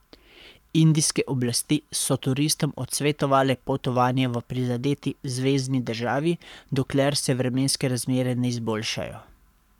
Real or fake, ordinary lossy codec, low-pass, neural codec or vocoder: fake; none; 19.8 kHz; codec, 44.1 kHz, 7.8 kbps, Pupu-Codec